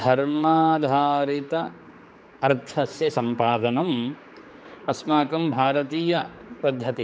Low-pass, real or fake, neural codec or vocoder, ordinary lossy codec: none; fake; codec, 16 kHz, 4 kbps, X-Codec, HuBERT features, trained on general audio; none